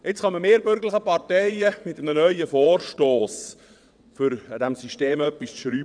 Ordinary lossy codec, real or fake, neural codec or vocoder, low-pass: none; fake; vocoder, 22.05 kHz, 80 mel bands, WaveNeXt; 9.9 kHz